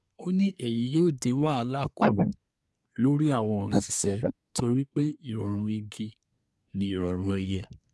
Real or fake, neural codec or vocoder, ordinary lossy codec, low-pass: fake; codec, 24 kHz, 1 kbps, SNAC; none; none